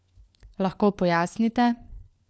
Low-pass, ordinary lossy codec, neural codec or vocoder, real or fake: none; none; codec, 16 kHz, 4 kbps, FunCodec, trained on LibriTTS, 50 frames a second; fake